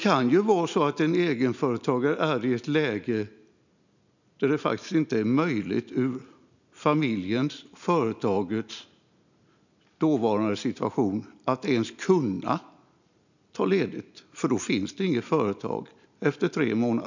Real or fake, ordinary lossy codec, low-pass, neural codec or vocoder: real; none; 7.2 kHz; none